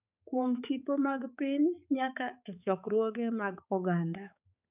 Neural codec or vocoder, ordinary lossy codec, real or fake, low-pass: codec, 16 kHz, 4 kbps, X-Codec, HuBERT features, trained on balanced general audio; none; fake; 3.6 kHz